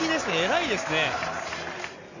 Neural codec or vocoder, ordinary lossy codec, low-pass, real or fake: none; none; 7.2 kHz; real